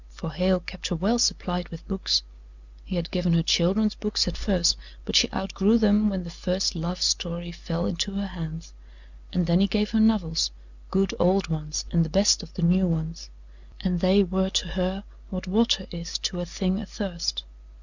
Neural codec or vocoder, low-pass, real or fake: none; 7.2 kHz; real